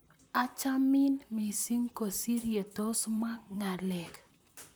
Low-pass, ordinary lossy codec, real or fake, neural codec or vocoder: none; none; fake; vocoder, 44.1 kHz, 128 mel bands, Pupu-Vocoder